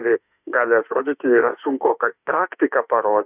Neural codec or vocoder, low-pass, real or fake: autoencoder, 48 kHz, 32 numbers a frame, DAC-VAE, trained on Japanese speech; 3.6 kHz; fake